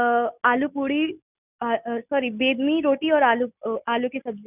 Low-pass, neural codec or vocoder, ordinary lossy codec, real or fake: 3.6 kHz; none; none; real